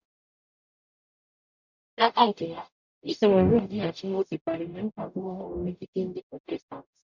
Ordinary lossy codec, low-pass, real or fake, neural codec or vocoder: none; 7.2 kHz; fake; codec, 44.1 kHz, 0.9 kbps, DAC